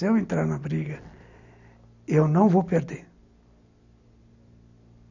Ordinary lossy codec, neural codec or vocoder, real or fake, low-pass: none; none; real; 7.2 kHz